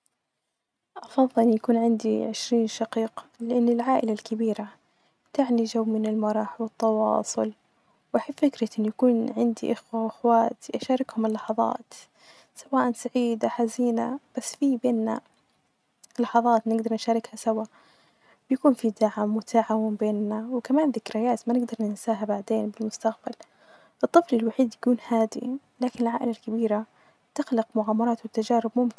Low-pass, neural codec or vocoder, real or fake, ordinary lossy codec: none; none; real; none